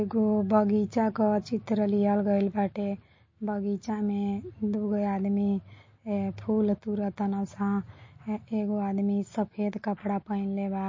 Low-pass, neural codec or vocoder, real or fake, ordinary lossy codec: 7.2 kHz; none; real; MP3, 32 kbps